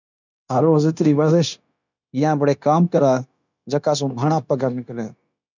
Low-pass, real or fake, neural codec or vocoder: 7.2 kHz; fake; codec, 16 kHz, 0.9 kbps, LongCat-Audio-Codec